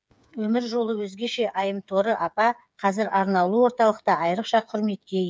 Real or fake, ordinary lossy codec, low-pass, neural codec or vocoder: fake; none; none; codec, 16 kHz, 8 kbps, FreqCodec, smaller model